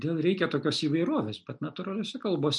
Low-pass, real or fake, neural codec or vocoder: 10.8 kHz; real; none